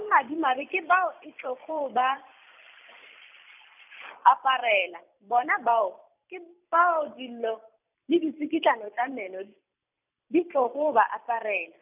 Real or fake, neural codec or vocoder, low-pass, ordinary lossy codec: fake; vocoder, 44.1 kHz, 128 mel bands every 256 samples, BigVGAN v2; 3.6 kHz; none